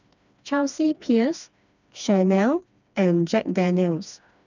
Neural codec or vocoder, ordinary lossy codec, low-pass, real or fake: codec, 16 kHz, 2 kbps, FreqCodec, smaller model; none; 7.2 kHz; fake